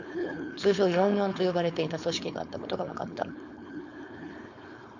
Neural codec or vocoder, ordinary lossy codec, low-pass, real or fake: codec, 16 kHz, 4.8 kbps, FACodec; none; 7.2 kHz; fake